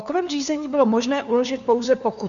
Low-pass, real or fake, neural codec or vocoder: 7.2 kHz; fake; codec, 16 kHz, 2 kbps, FunCodec, trained on LibriTTS, 25 frames a second